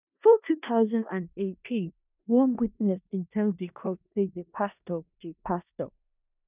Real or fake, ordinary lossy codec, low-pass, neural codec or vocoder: fake; none; 3.6 kHz; codec, 16 kHz in and 24 kHz out, 0.4 kbps, LongCat-Audio-Codec, four codebook decoder